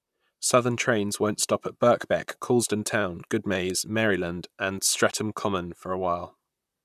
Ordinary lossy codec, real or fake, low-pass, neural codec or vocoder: none; fake; 14.4 kHz; vocoder, 44.1 kHz, 128 mel bands, Pupu-Vocoder